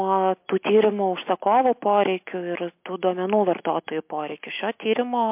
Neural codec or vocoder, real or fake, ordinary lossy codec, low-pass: none; real; MP3, 32 kbps; 3.6 kHz